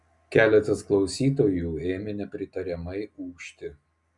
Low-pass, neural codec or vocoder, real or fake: 10.8 kHz; none; real